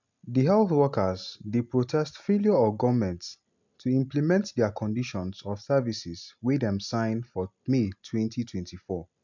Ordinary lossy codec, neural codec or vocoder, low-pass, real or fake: MP3, 64 kbps; none; 7.2 kHz; real